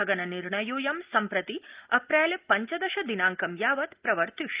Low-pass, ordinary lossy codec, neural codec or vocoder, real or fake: 3.6 kHz; Opus, 32 kbps; none; real